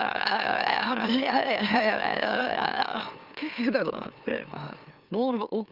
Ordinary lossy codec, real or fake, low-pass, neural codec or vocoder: Opus, 32 kbps; fake; 5.4 kHz; autoencoder, 44.1 kHz, a latent of 192 numbers a frame, MeloTTS